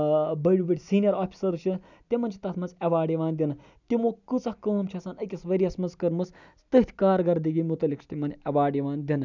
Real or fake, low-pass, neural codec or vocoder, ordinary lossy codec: real; 7.2 kHz; none; none